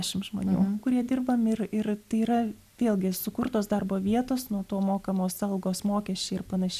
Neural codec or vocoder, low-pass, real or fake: none; 14.4 kHz; real